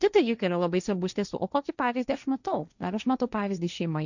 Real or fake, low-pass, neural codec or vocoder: fake; 7.2 kHz; codec, 16 kHz, 1.1 kbps, Voila-Tokenizer